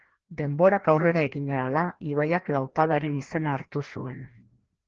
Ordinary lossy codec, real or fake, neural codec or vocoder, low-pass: Opus, 16 kbps; fake; codec, 16 kHz, 1 kbps, FreqCodec, larger model; 7.2 kHz